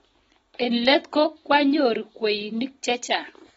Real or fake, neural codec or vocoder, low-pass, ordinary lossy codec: real; none; 10.8 kHz; AAC, 24 kbps